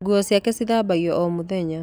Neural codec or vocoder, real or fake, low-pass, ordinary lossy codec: none; real; none; none